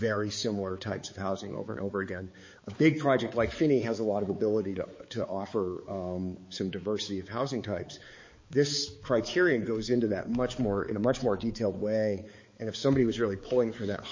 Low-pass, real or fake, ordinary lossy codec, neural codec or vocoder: 7.2 kHz; fake; MP3, 32 kbps; codec, 16 kHz, 4 kbps, X-Codec, HuBERT features, trained on balanced general audio